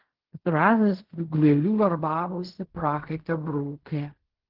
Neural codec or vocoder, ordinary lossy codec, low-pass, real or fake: codec, 16 kHz in and 24 kHz out, 0.4 kbps, LongCat-Audio-Codec, fine tuned four codebook decoder; Opus, 16 kbps; 5.4 kHz; fake